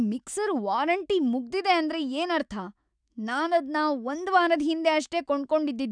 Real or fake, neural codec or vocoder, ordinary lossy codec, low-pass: fake; autoencoder, 48 kHz, 128 numbers a frame, DAC-VAE, trained on Japanese speech; none; 9.9 kHz